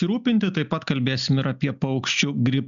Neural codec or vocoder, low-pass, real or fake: none; 7.2 kHz; real